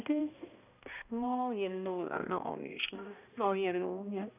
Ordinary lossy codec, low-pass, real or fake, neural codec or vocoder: none; 3.6 kHz; fake; codec, 16 kHz, 1 kbps, X-Codec, HuBERT features, trained on balanced general audio